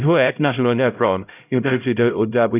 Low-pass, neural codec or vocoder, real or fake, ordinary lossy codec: 3.6 kHz; codec, 16 kHz, 0.5 kbps, X-Codec, HuBERT features, trained on LibriSpeech; fake; none